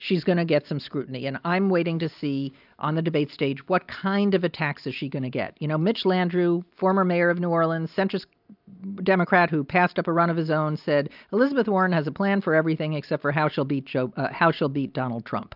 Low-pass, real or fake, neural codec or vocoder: 5.4 kHz; real; none